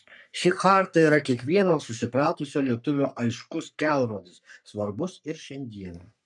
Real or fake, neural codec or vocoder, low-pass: fake; codec, 44.1 kHz, 3.4 kbps, Pupu-Codec; 10.8 kHz